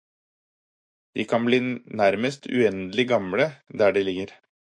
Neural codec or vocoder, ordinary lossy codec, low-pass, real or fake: autoencoder, 48 kHz, 128 numbers a frame, DAC-VAE, trained on Japanese speech; MP3, 48 kbps; 9.9 kHz; fake